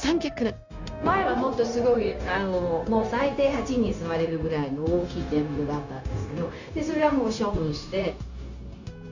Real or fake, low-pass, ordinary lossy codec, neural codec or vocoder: fake; 7.2 kHz; none; codec, 16 kHz, 0.9 kbps, LongCat-Audio-Codec